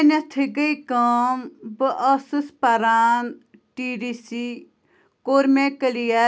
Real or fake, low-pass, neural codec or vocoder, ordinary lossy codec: real; none; none; none